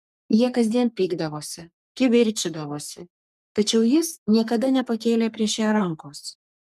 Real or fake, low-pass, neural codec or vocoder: fake; 14.4 kHz; codec, 44.1 kHz, 3.4 kbps, Pupu-Codec